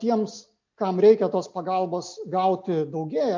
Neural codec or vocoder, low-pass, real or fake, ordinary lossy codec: none; 7.2 kHz; real; MP3, 48 kbps